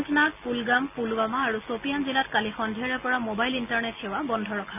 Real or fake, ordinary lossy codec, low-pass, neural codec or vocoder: real; none; 3.6 kHz; none